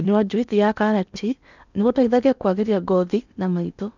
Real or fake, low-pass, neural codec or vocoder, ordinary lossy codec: fake; 7.2 kHz; codec, 16 kHz in and 24 kHz out, 0.8 kbps, FocalCodec, streaming, 65536 codes; none